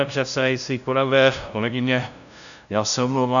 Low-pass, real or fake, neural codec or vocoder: 7.2 kHz; fake; codec, 16 kHz, 0.5 kbps, FunCodec, trained on LibriTTS, 25 frames a second